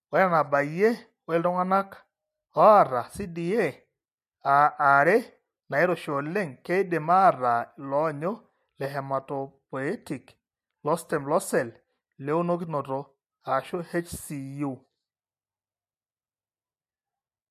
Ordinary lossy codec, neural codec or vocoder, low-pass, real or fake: MP3, 64 kbps; none; 14.4 kHz; real